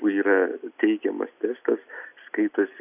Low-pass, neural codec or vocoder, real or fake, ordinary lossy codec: 3.6 kHz; none; real; AAC, 32 kbps